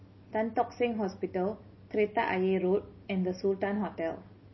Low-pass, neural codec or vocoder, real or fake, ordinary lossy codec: 7.2 kHz; none; real; MP3, 24 kbps